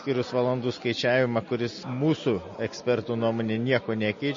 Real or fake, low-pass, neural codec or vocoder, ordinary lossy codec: real; 7.2 kHz; none; MP3, 32 kbps